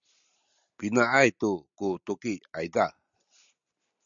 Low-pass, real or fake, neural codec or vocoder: 7.2 kHz; real; none